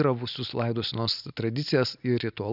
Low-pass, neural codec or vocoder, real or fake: 5.4 kHz; none; real